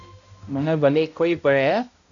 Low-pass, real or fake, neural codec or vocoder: 7.2 kHz; fake; codec, 16 kHz, 0.5 kbps, X-Codec, HuBERT features, trained on balanced general audio